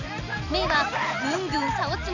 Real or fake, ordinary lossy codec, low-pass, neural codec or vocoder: real; none; 7.2 kHz; none